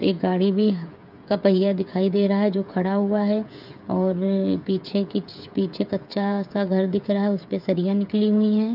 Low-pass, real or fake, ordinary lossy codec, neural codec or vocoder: 5.4 kHz; fake; none; codec, 16 kHz, 8 kbps, FreqCodec, smaller model